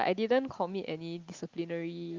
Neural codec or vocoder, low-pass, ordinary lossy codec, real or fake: none; 7.2 kHz; Opus, 24 kbps; real